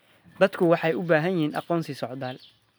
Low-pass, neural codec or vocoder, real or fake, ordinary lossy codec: none; none; real; none